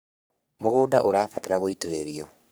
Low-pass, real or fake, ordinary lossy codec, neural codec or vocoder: none; fake; none; codec, 44.1 kHz, 3.4 kbps, Pupu-Codec